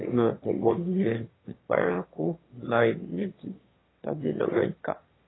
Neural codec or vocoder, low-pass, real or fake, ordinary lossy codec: autoencoder, 22.05 kHz, a latent of 192 numbers a frame, VITS, trained on one speaker; 7.2 kHz; fake; AAC, 16 kbps